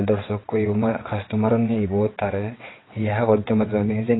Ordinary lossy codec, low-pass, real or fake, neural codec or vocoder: AAC, 16 kbps; 7.2 kHz; fake; vocoder, 22.05 kHz, 80 mel bands, WaveNeXt